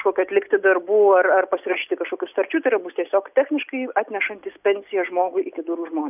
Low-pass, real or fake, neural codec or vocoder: 3.6 kHz; real; none